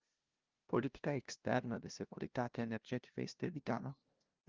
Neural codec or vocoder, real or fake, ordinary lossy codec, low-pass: codec, 16 kHz, 0.5 kbps, FunCodec, trained on LibriTTS, 25 frames a second; fake; Opus, 32 kbps; 7.2 kHz